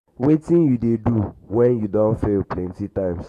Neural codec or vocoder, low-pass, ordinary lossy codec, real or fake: autoencoder, 48 kHz, 128 numbers a frame, DAC-VAE, trained on Japanese speech; 14.4 kHz; AAC, 48 kbps; fake